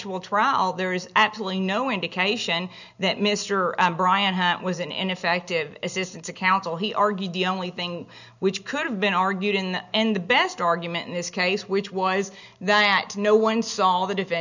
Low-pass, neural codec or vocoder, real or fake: 7.2 kHz; none; real